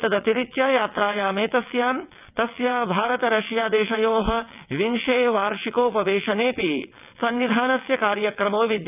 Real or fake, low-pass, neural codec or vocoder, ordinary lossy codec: fake; 3.6 kHz; vocoder, 22.05 kHz, 80 mel bands, WaveNeXt; none